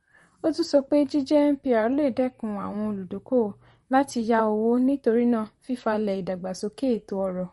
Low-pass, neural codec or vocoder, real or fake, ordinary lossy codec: 19.8 kHz; vocoder, 44.1 kHz, 128 mel bands, Pupu-Vocoder; fake; MP3, 48 kbps